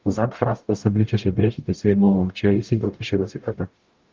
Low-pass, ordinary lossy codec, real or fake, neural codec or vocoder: 7.2 kHz; Opus, 32 kbps; fake; codec, 44.1 kHz, 0.9 kbps, DAC